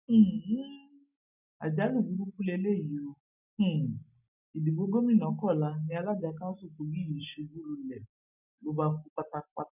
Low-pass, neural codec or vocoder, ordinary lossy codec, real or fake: 3.6 kHz; none; none; real